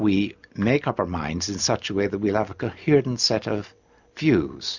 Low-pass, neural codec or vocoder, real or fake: 7.2 kHz; none; real